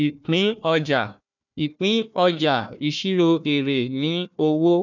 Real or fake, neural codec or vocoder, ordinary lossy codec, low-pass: fake; codec, 16 kHz, 1 kbps, FunCodec, trained on Chinese and English, 50 frames a second; none; 7.2 kHz